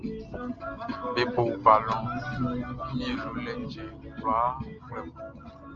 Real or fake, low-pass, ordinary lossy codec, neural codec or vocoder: real; 7.2 kHz; Opus, 24 kbps; none